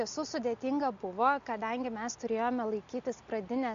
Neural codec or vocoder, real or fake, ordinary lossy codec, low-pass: none; real; Opus, 64 kbps; 7.2 kHz